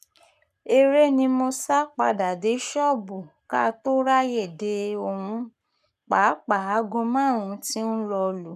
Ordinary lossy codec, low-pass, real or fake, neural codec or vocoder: none; 14.4 kHz; fake; codec, 44.1 kHz, 7.8 kbps, Pupu-Codec